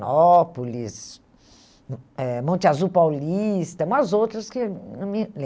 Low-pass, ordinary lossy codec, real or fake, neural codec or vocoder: none; none; real; none